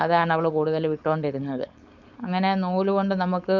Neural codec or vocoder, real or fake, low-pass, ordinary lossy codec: codec, 16 kHz, 4.8 kbps, FACodec; fake; 7.2 kHz; none